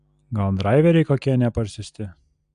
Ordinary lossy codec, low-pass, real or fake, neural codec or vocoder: AAC, 96 kbps; 9.9 kHz; real; none